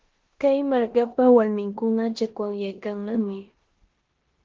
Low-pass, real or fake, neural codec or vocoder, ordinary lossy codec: 7.2 kHz; fake; codec, 16 kHz in and 24 kHz out, 0.9 kbps, LongCat-Audio-Codec, four codebook decoder; Opus, 16 kbps